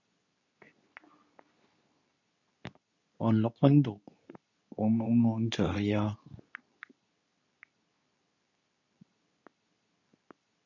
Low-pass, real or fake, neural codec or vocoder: 7.2 kHz; fake; codec, 24 kHz, 0.9 kbps, WavTokenizer, medium speech release version 2